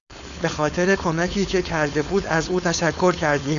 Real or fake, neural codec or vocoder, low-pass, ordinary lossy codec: fake; codec, 16 kHz, 4.8 kbps, FACodec; 7.2 kHz; Opus, 64 kbps